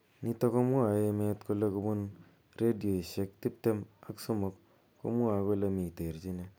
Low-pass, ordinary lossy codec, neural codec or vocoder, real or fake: none; none; none; real